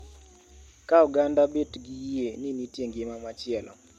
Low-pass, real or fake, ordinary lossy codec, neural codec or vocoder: 19.8 kHz; real; MP3, 64 kbps; none